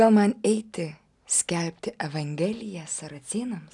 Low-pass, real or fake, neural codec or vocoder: 10.8 kHz; real; none